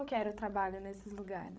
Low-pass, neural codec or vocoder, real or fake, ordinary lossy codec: none; codec, 16 kHz, 16 kbps, FreqCodec, larger model; fake; none